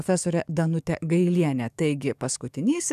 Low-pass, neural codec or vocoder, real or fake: 14.4 kHz; codec, 44.1 kHz, 7.8 kbps, DAC; fake